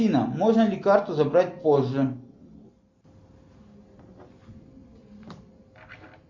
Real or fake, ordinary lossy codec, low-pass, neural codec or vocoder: real; MP3, 48 kbps; 7.2 kHz; none